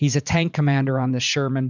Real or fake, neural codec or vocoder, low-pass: fake; vocoder, 44.1 kHz, 80 mel bands, Vocos; 7.2 kHz